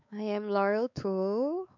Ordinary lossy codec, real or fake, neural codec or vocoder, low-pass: none; fake; codec, 16 kHz, 4 kbps, X-Codec, WavLM features, trained on Multilingual LibriSpeech; 7.2 kHz